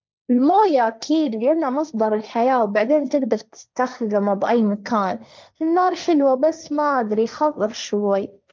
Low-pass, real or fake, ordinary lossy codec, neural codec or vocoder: none; fake; none; codec, 16 kHz, 1.1 kbps, Voila-Tokenizer